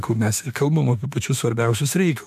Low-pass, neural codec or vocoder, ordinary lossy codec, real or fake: 14.4 kHz; autoencoder, 48 kHz, 32 numbers a frame, DAC-VAE, trained on Japanese speech; AAC, 96 kbps; fake